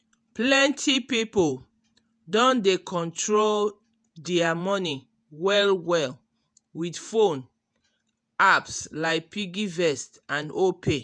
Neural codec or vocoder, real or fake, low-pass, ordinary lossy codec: vocoder, 48 kHz, 128 mel bands, Vocos; fake; 9.9 kHz; none